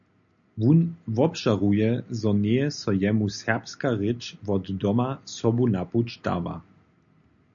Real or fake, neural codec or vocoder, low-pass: real; none; 7.2 kHz